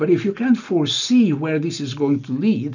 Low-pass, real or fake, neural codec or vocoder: 7.2 kHz; real; none